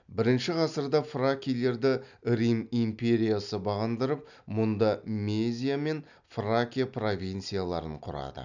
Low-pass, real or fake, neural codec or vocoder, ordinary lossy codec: 7.2 kHz; real; none; none